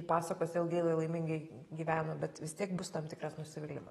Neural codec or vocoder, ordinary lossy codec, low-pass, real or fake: none; AAC, 32 kbps; 19.8 kHz; real